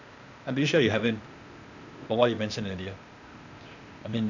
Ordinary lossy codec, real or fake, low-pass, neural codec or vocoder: none; fake; 7.2 kHz; codec, 16 kHz, 0.8 kbps, ZipCodec